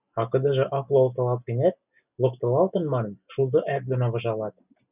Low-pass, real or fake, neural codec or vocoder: 3.6 kHz; real; none